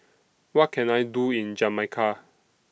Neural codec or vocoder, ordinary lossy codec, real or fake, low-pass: none; none; real; none